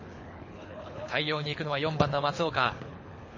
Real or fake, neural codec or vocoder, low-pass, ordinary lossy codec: fake; codec, 24 kHz, 6 kbps, HILCodec; 7.2 kHz; MP3, 32 kbps